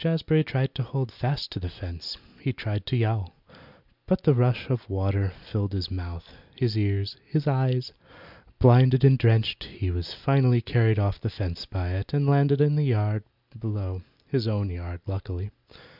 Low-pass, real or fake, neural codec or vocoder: 5.4 kHz; real; none